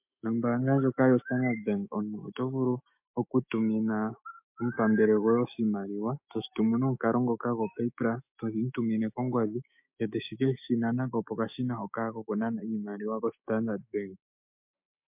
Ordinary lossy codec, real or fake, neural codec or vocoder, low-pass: MP3, 32 kbps; fake; autoencoder, 48 kHz, 128 numbers a frame, DAC-VAE, trained on Japanese speech; 3.6 kHz